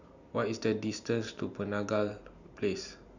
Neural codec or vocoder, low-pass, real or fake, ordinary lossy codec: none; 7.2 kHz; real; none